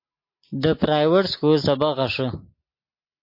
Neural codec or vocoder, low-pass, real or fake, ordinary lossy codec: none; 5.4 kHz; real; MP3, 32 kbps